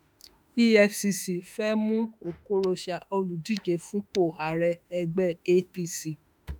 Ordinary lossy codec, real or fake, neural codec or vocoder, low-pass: none; fake; autoencoder, 48 kHz, 32 numbers a frame, DAC-VAE, trained on Japanese speech; none